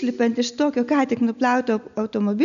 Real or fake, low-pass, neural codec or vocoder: real; 7.2 kHz; none